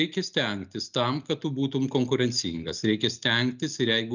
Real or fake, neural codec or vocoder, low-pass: real; none; 7.2 kHz